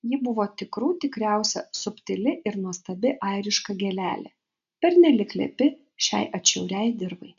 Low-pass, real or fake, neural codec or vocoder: 7.2 kHz; real; none